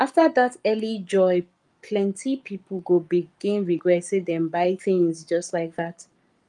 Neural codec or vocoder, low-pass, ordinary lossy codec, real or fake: codec, 44.1 kHz, 7.8 kbps, Pupu-Codec; 10.8 kHz; Opus, 32 kbps; fake